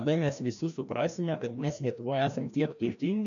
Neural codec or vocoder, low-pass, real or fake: codec, 16 kHz, 1 kbps, FreqCodec, larger model; 7.2 kHz; fake